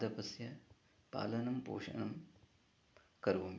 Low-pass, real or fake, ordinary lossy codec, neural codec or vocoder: none; real; none; none